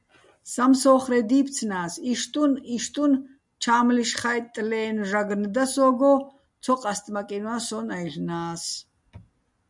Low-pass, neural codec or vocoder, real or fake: 10.8 kHz; none; real